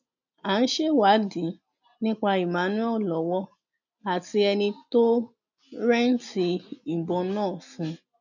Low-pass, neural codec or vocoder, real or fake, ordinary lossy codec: 7.2 kHz; none; real; none